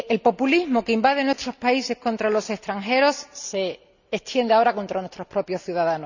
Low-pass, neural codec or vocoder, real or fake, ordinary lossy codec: 7.2 kHz; none; real; none